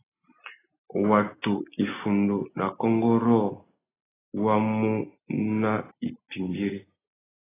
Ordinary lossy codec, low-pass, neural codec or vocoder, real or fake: AAC, 16 kbps; 3.6 kHz; none; real